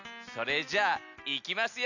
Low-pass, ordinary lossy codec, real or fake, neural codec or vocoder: 7.2 kHz; none; real; none